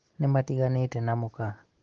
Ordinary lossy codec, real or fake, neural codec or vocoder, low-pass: Opus, 16 kbps; real; none; 7.2 kHz